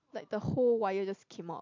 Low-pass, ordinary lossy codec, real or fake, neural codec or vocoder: 7.2 kHz; MP3, 48 kbps; real; none